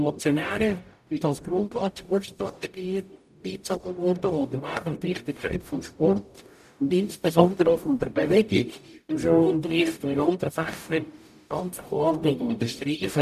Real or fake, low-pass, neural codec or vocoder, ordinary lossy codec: fake; 14.4 kHz; codec, 44.1 kHz, 0.9 kbps, DAC; none